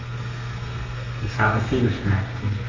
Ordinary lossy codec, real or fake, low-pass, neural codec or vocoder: Opus, 32 kbps; fake; 7.2 kHz; codec, 32 kHz, 1.9 kbps, SNAC